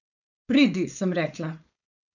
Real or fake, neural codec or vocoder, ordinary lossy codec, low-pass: fake; codec, 16 kHz, 4.8 kbps, FACodec; none; 7.2 kHz